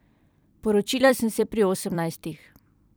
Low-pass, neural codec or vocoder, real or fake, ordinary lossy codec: none; vocoder, 44.1 kHz, 128 mel bands every 256 samples, BigVGAN v2; fake; none